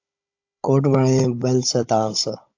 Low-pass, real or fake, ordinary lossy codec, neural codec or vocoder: 7.2 kHz; fake; AAC, 48 kbps; codec, 16 kHz, 16 kbps, FunCodec, trained on Chinese and English, 50 frames a second